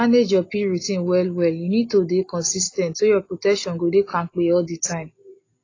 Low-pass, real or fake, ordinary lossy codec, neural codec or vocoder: 7.2 kHz; real; AAC, 32 kbps; none